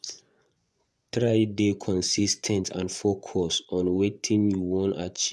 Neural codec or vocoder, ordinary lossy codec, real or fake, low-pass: vocoder, 24 kHz, 100 mel bands, Vocos; none; fake; none